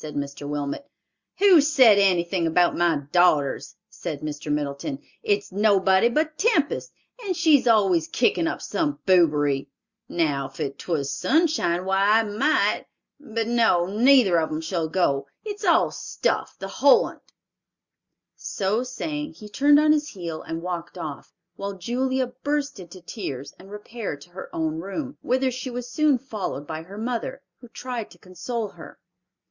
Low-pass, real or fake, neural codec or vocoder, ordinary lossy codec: 7.2 kHz; real; none; Opus, 64 kbps